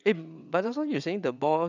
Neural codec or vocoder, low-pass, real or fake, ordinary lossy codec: vocoder, 22.05 kHz, 80 mel bands, WaveNeXt; 7.2 kHz; fake; none